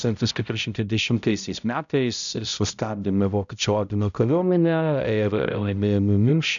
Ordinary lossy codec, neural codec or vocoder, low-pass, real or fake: MP3, 96 kbps; codec, 16 kHz, 0.5 kbps, X-Codec, HuBERT features, trained on balanced general audio; 7.2 kHz; fake